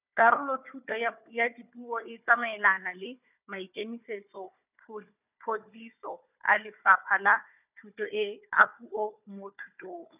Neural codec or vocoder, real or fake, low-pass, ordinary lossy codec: codec, 16 kHz, 4 kbps, FunCodec, trained on Chinese and English, 50 frames a second; fake; 3.6 kHz; none